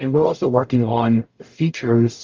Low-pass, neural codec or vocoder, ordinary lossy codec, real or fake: 7.2 kHz; codec, 44.1 kHz, 0.9 kbps, DAC; Opus, 32 kbps; fake